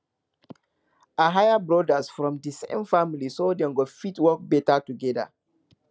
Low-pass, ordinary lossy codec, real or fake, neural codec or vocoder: none; none; real; none